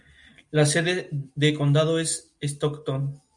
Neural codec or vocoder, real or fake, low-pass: none; real; 10.8 kHz